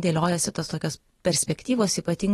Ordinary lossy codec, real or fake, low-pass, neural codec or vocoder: AAC, 32 kbps; real; 19.8 kHz; none